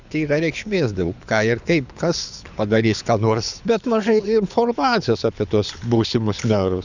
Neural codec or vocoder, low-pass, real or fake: codec, 24 kHz, 6 kbps, HILCodec; 7.2 kHz; fake